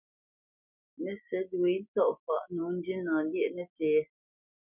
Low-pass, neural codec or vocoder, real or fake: 3.6 kHz; none; real